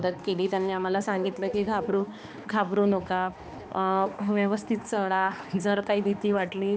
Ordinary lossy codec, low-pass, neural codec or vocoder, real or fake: none; none; codec, 16 kHz, 2 kbps, X-Codec, HuBERT features, trained on balanced general audio; fake